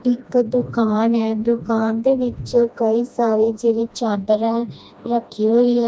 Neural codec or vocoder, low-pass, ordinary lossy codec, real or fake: codec, 16 kHz, 1 kbps, FreqCodec, smaller model; none; none; fake